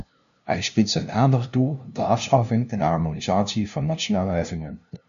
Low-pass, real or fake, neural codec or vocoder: 7.2 kHz; fake; codec, 16 kHz, 0.5 kbps, FunCodec, trained on LibriTTS, 25 frames a second